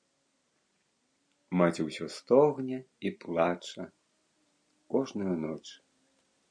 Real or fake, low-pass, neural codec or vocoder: real; 9.9 kHz; none